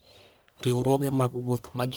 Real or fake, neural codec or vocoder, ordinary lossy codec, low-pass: fake; codec, 44.1 kHz, 1.7 kbps, Pupu-Codec; none; none